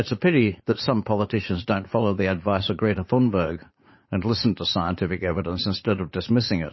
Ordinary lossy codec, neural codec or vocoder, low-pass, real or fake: MP3, 24 kbps; vocoder, 44.1 kHz, 80 mel bands, Vocos; 7.2 kHz; fake